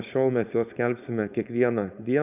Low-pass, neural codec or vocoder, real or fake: 3.6 kHz; codec, 16 kHz, 4.8 kbps, FACodec; fake